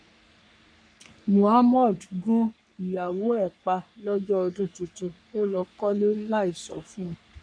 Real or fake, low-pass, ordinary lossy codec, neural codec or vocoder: fake; 9.9 kHz; none; codec, 44.1 kHz, 3.4 kbps, Pupu-Codec